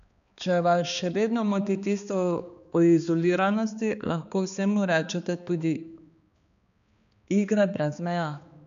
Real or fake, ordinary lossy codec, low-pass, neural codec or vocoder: fake; none; 7.2 kHz; codec, 16 kHz, 2 kbps, X-Codec, HuBERT features, trained on balanced general audio